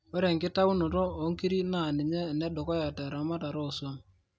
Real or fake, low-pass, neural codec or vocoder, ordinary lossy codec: real; none; none; none